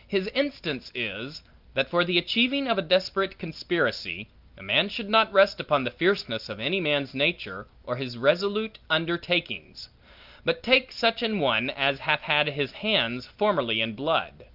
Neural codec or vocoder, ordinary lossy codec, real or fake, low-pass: none; Opus, 24 kbps; real; 5.4 kHz